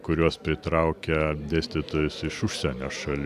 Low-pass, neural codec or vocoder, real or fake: 14.4 kHz; none; real